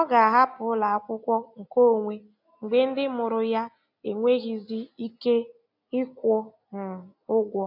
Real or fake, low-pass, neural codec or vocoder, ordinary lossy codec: real; 5.4 kHz; none; none